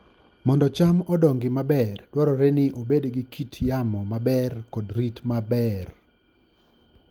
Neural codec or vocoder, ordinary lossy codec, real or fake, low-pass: none; Opus, 32 kbps; real; 19.8 kHz